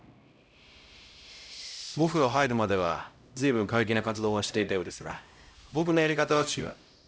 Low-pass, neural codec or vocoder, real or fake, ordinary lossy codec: none; codec, 16 kHz, 0.5 kbps, X-Codec, HuBERT features, trained on LibriSpeech; fake; none